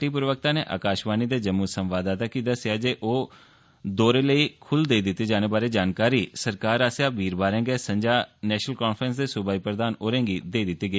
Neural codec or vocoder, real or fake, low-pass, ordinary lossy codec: none; real; none; none